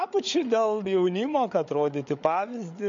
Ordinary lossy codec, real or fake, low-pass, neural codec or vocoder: MP3, 48 kbps; fake; 7.2 kHz; codec, 16 kHz, 8 kbps, FreqCodec, larger model